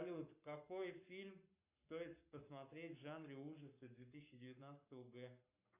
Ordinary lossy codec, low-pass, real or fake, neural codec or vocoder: AAC, 32 kbps; 3.6 kHz; fake; codec, 44.1 kHz, 7.8 kbps, Pupu-Codec